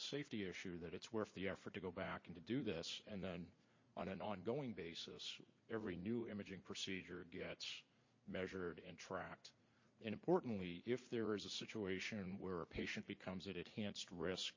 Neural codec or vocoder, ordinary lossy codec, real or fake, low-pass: vocoder, 44.1 kHz, 80 mel bands, Vocos; MP3, 32 kbps; fake; 7.2 kHz